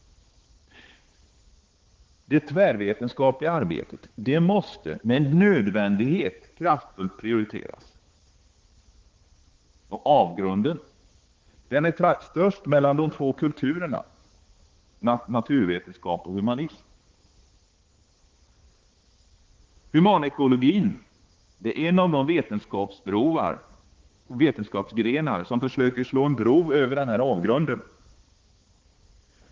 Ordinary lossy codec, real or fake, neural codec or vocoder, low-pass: Opus, 16 kbps; fake; codec, 16 kHz, 4 kbps, X-Codec, HuBERT features, trained on balanced general audio; 7.2 kHz